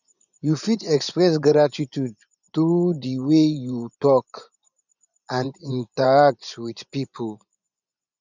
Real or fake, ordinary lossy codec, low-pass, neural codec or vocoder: fake; none; 7.2 kHz; vocoder, 24 kHz, 100 mel bands, Vocos